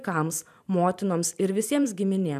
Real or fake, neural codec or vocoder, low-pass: fake; vocoder, 44.1 kHz, 128 mel bands every 512 samples, BigVGAN v2; 14.4 kHz